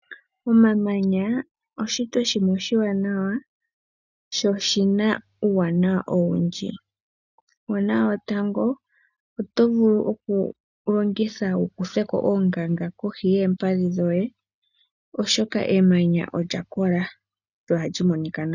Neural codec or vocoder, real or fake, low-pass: none; real; 7.2 kHz